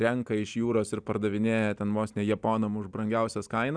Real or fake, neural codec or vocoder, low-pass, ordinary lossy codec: real; none; 9.9 kHz; Opus, 64 kbps